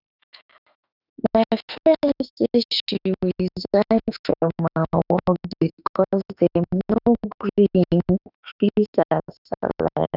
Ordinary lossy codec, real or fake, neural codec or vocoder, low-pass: none; fake; autoencoder, 48 kHz, 32 numbers a frame, DAC-VAE, trained on Japanese speech; 5.4 kHz